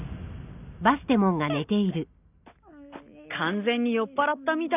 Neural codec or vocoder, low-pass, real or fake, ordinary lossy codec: none; 3.6 kHz; real; none